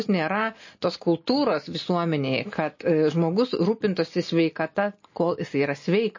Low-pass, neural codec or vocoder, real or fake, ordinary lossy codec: 7.2 kHz; none; real; MP3, 32 kbps